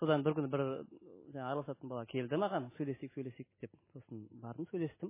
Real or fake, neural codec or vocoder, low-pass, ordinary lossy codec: real; none; 3.6 kHz; MP3, 16 kbps